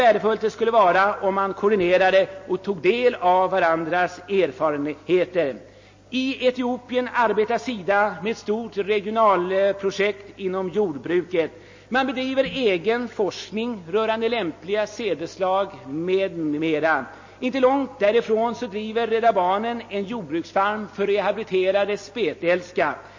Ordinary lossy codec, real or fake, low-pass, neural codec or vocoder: MP3, 32 kbps; real; 7.2 kHz; none